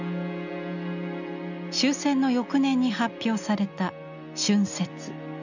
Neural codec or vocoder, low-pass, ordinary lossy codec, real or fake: none; 7.2 kHz; none; real